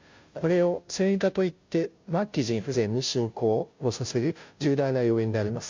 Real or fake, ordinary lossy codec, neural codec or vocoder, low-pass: fake; MP3, 48 kbps; codec, 16 kHz, 0.5 kbps, FunCodec, trained on Chinese and English, 25 frames a second; 7.2 kHz